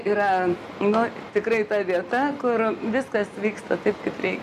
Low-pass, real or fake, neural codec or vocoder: 14.4 kHz; real; none